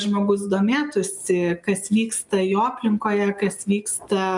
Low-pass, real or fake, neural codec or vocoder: 10.8 kHz; real; none